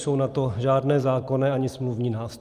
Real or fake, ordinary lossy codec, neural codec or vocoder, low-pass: real; Opus, 32 kbps; none; 14.4 kHz